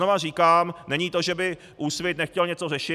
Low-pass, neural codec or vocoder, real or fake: 14.4 kHz; none; real